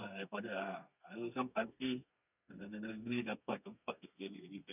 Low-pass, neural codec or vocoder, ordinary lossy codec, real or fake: 3.6 kHz; codec, 32 kHz, 1.9 kbps, SNAC; none; fake